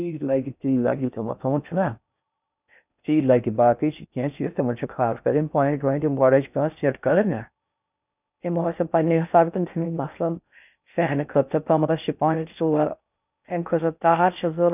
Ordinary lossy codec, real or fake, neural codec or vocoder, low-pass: none; fake; codec, 16 kHz in and 24 kHz out, 0.6 kbps, FocalCodec, streaming, 4096 codes; 3.6 kHz